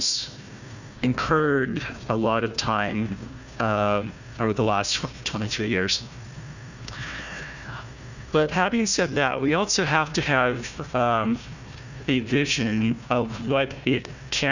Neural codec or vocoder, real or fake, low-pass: codec, 16 kHz, 1 kbps, FunCodec, trained on Chinese and English, 50 frames a second; fake; 7.2 kHz